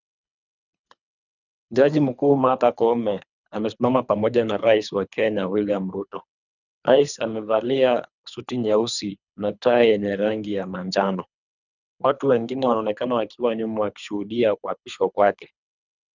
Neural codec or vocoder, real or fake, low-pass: codec, 24 kHz, 3 kbps, HILCodec; fake; 7.2 kHz